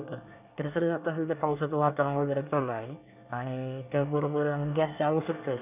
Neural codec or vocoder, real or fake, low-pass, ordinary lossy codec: codec, 24 kHz, 1 kbps, SNAC; fake; 3.6 kHz; none